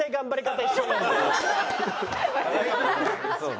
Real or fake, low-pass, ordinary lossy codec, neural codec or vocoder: real; none; none; none